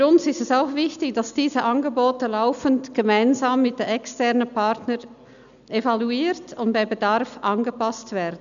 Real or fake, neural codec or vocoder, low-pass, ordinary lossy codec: real; none; 7.2 kHz; none